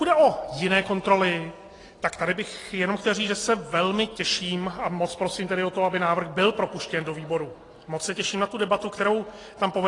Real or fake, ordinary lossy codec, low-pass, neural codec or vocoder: real; AAC, 32 kbps; 10.8 kHz; none